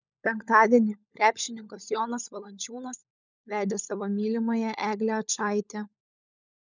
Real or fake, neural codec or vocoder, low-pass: fake; codec, 16 kHz, 16 kbps, FunCodec, trained on LibriTTS, 50 frames a second; 7.2 kHz